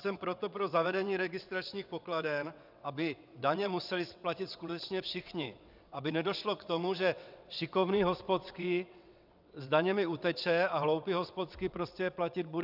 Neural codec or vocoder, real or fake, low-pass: vocoder, 22.05 kHz, 80 mel bands, WaveNeXt; fake; 5.4 kHz